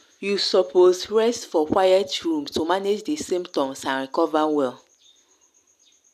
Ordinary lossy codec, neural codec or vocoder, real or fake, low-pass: none; none; real; 14.4 kHz